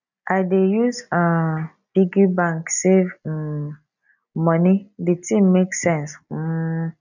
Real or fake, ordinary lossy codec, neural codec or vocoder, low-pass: real; none; none; 7.2 kHz